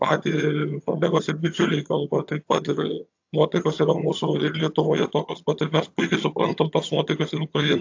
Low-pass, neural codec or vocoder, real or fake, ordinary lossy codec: 7.2 kHz; vocoder, 22.05 kHz, 80 mel bands, HiFi-GAN; fake; AAC, 48 kbps